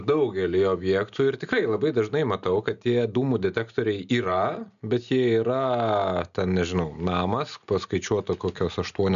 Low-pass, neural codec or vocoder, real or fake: 7.2 kHz; none; real